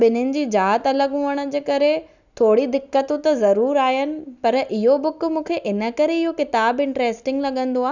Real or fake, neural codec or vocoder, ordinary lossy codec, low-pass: real; none; none; 7.2 kHz